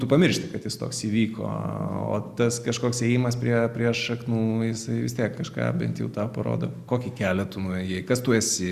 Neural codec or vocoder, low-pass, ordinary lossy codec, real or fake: none; 14.4 kHz; Opus, 64 kbps; real